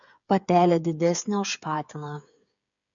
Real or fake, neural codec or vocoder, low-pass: fake; codec, 16 kHz, 8 kbps, FreqCodec, smaller model; 7.2 kHz